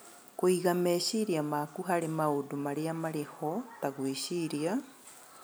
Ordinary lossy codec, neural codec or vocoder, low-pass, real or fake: none; none; none; real